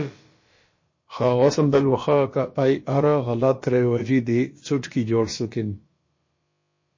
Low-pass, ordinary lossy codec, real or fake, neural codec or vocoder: 7.2 kHz; MP3, 32 kbps; fake; codec, 16 kHz, about 1 kbps, DyCAST, with the encoder's durations